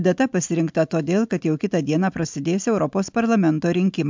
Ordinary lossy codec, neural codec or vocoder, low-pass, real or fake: MP3, 64 kbps; none; 7.2 kHz; real